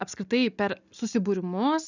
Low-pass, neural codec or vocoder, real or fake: 7.2 kHz; none; real